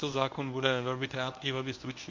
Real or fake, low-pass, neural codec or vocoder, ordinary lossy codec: fake; 7.2 kHz; codec, 24 kHz, 0.9 kbps, WavTokenizer, medium speech release version 1; MP3, 48 kbps